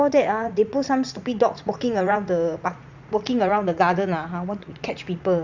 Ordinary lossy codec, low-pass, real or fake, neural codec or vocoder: Opus, 64 kbps; 7.2 kHz; fake; vocoder, 44.1 kHz, 80 mel bands, Vocos